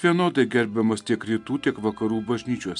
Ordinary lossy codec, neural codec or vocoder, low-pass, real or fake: MP3, 96 kbps; none; 10.8 kHz; real